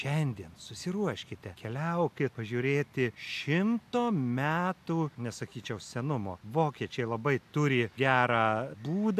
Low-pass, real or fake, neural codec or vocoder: 14.4 kHz; real; none